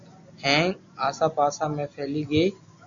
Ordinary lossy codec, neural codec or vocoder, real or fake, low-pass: MP3, 64 kbps; none; real; 7.2 kHz